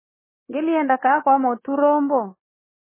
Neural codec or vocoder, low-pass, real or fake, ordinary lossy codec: codec, 24 kHz, 3.1 kbps, DualCodec; 3.6 kHz; fake; MP3, 16 kbps